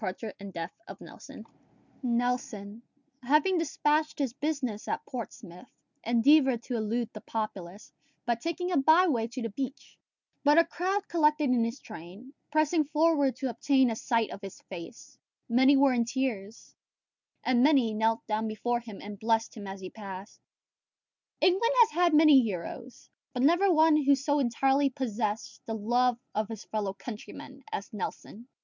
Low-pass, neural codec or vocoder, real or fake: 7.2 kHz; none; real